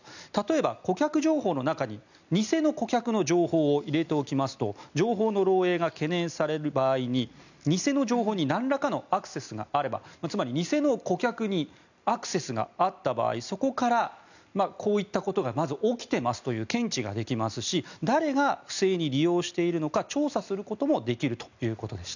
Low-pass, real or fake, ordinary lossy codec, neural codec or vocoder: 7.2 kHz; real; none; none